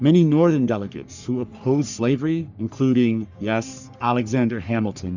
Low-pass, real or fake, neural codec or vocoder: 7.2 kHz; fake; codec, 44.1 kHz, 3.4 kbps, Pupu-Codec